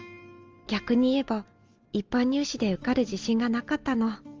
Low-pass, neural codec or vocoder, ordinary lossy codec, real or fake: 7.2 kHz; none; Opus, 32 kbps; real